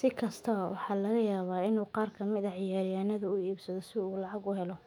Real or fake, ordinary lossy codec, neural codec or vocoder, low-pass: fake; none; autoencoder, 48 kHz, 128 numbers a frame, DAC-VAE, trained on Japanese speech; 19.8 kHz